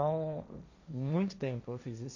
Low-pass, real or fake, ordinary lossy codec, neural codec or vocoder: 7.2 kHz; fake; none; codec, 16 kHz, 1.1 kbps, Voila-Tokenizer